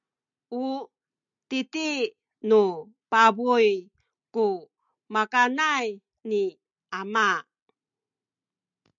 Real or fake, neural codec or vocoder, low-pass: real; none; 7.2 kHz